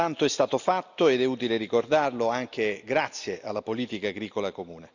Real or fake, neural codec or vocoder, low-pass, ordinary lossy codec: real; none; 7.2 kHz; none